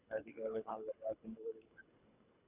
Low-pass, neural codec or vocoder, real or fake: 3.6 kHz; codec, 24 kHz, 3 kbps, HILCodec; fake